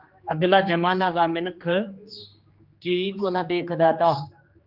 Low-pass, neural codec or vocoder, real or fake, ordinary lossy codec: 5.4 kHz; codec, 16 kHz, 2 kbps, X-Codec, HuBERT features, trained on general audio; fake; Opus, 32 kbps